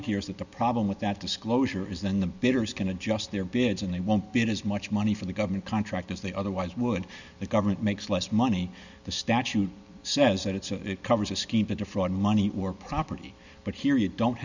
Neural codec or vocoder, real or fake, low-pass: none; real; 7.2 kHz